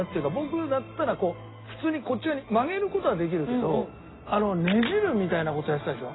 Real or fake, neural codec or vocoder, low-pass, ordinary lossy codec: real; none; 7.2 kHz; AAC, 16 kbps